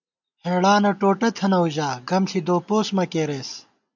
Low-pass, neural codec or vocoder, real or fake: 7.2 kHz; none; real